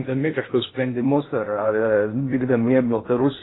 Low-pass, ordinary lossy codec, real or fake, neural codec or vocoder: 7.2 kHz; AAC, 16 kbps; fake; codec, 16 kHz in and 24 kHz out, 0.6 kbps, FocalCodec, streaming, 2048 codes